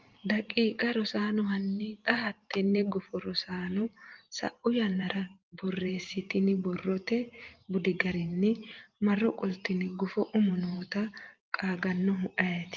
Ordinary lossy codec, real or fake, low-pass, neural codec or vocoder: Opus, 32 kbps; fake; 7.2 kHz; vocoder, 44.1 kHz, 128 mel bands every 512 samples, BigVGAN v2